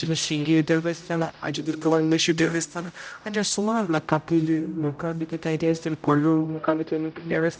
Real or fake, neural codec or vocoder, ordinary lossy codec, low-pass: fake; codec, 16 kHz, 0.5 kbps, X-Codec, HuBERT features, trained on general audio; none; none